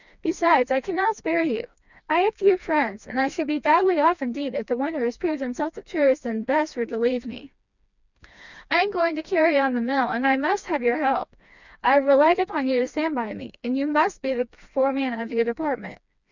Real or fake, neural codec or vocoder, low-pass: fake; codec, 16 kHz, 2 kbps, FreqCodec, smaller model; 7.2 kHz